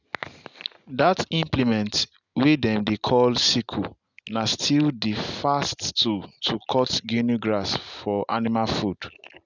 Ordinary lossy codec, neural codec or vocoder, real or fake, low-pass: none; none; real; 7.2 kHz